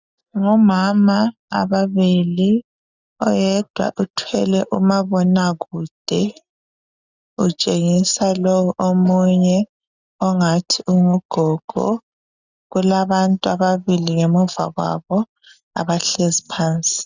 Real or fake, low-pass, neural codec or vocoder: real; 7.2 kHz; none